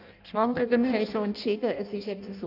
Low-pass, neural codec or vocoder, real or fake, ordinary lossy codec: 5.4 kHz; codec, 16 kHz in and 24 kHz out, 0.6 kbps, FireRedTTS-2 codec; fake; none